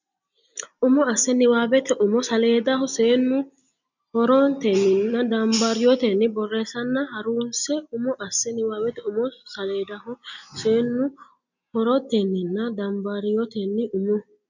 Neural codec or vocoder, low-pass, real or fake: none; 7.2 kHz; real